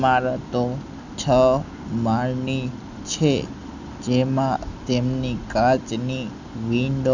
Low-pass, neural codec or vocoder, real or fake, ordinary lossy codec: 7.2 kHz; none; real; none